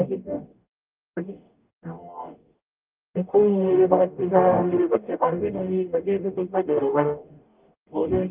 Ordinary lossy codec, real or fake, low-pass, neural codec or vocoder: Opus, 32 kbps; fake; 3.6 kHz; codec, 44.1 kHz, 0.9 kbps, DAC